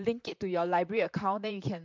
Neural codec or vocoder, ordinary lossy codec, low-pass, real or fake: vocoder, 44.1 kHz, 128 mel bands, Pupu-Vocoder; MP3, 64 kbps; 7.2 kHz; fake